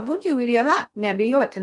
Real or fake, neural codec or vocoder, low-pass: fake; codec, 16 kHz in and 24 kHz out, 0.6 kbps, FocalCodec, streaming, 2048 codes; 10.8 kHz